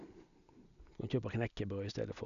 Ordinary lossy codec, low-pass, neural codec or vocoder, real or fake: none; 7.2 kHz; none; real